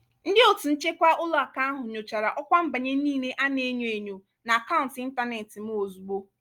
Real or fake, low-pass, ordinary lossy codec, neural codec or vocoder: real; 19.8 kHz; Opus, 32 kbps; none